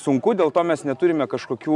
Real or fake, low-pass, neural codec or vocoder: real; 10.8 kHz; none